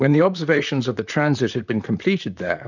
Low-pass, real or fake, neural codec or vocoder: 7.2 kHz; fake; vocoder, 44.1 kHz, 128 mel bands, Pupu-Vocoder